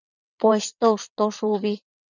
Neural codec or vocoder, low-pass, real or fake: vocoder, 22.05 kHz, 80 mel bands, WaveNeXt; 7.2 kHz; fake